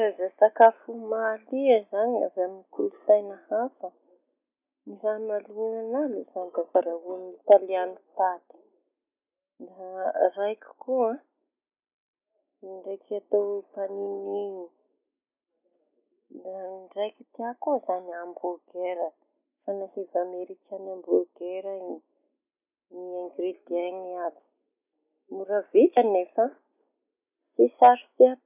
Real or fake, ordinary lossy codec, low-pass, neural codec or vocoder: real; none; 3.6 kHz; none